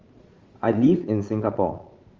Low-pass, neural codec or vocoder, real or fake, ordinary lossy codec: 7.2 kHz; vocoder, 22.05 kHz, 80 mel bands, Vocos; fake; Opus, 32 kbps